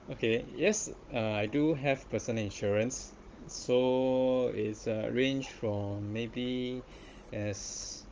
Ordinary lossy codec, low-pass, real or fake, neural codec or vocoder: Opus, 24 kbps; 7.2 kHz; fake; codec, 16 kHz, 16 kbps, FunCodec, trained on Chinese and English, 50 frames a second